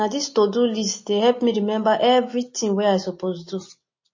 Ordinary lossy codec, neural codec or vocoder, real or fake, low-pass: MP3, 32 kbps; none; real; 7.2 kHz